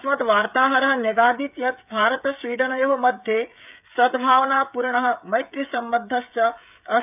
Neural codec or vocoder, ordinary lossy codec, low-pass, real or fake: codec, 16 kHz, 16 kbps, FreqCodec, smaller model; none; 3.6 kHz; fake